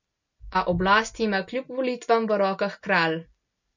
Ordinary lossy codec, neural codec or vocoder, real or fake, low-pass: none; none; real; 7.2 kHz